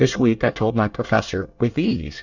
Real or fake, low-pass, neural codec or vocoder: fake; 7.2 kHz; codec, 24 kHz, 1 kbps, SNAC